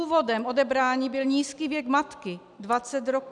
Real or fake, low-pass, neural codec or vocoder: real; 10.8 kHz; none